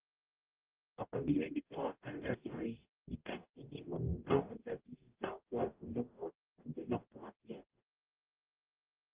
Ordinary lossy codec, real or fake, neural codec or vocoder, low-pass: Opus, 32 kbps; fake; codec, 44.1 kHz, 0.9 kbps, DAC; 3.6 kHz